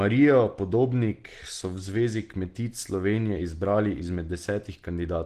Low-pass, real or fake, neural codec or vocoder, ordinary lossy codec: 14.4 kHz; real; none; Opus, 16 kbps